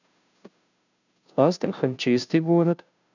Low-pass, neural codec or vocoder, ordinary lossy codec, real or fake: 7.2 kHz; codec, 16 kHz, 0.5 kbps, FunCodec, trained on Chinese and English, 25 frames a second; none; fake